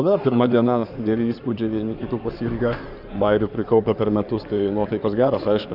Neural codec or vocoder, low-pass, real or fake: codec, 16 kHz in and 24 kHz out, 2.2 kbps, FireRedTTS-2 codec; 5.4 kHz; fake